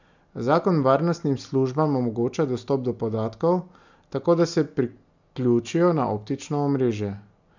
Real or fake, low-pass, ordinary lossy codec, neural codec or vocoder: real; 7.2 kHz; none; none